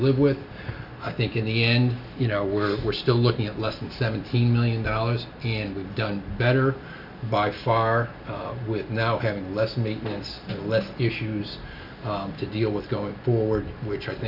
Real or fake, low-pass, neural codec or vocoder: real; 5.4 kHz; none